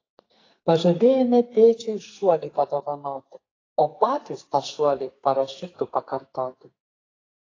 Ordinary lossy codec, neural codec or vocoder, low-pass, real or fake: AAC, 32 kbps; codec, 44.1 kHz, 2.6 kbps, SNAC; 7.2 kHz; fake